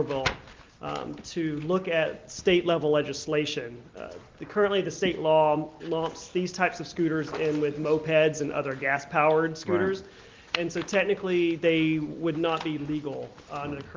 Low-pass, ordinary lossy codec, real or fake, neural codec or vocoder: 7.2 kHz; Opus, 24 kbps; real; none